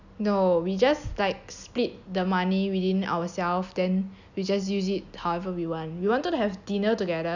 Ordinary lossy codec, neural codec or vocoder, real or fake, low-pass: none; none; real; 7.2 kHz